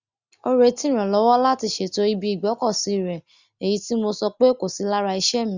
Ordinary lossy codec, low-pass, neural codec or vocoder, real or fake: Opus, 64 kbps; 7.2 kHz; none; real